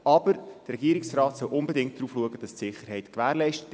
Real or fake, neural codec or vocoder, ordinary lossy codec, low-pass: real; none; none; none